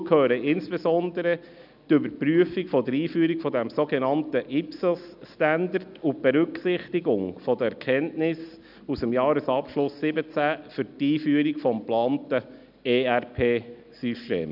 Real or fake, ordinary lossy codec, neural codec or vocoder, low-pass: real; none; none; 5.4 kHz